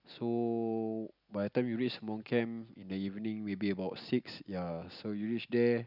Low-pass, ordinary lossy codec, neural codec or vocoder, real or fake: 5.4 kHz; none; none; real